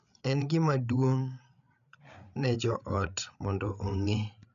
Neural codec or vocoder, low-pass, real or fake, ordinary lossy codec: codec, 16 kHz, 8 kbps, FreqCodec, larger model; 7.2 kHz; fake; none